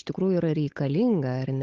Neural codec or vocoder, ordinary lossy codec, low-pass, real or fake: codec, 16 kHz, 8 kbps, FunCodec, trained on Chinese and English, 25 frames a second; Opus, 16 kbps; 7.2 kHz; fake